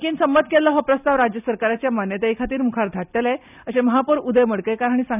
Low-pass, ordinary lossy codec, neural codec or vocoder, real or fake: 3.6 kHz; none; none; real